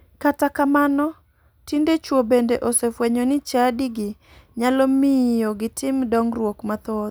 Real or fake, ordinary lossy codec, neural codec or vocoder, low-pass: real; none; none; none